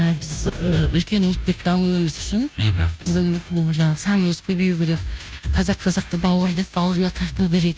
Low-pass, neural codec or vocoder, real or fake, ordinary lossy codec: none; codec, 16 kHz, 0.5 kbps, FunCodec, trained on Chinese and English, 25 frames a second; fake; none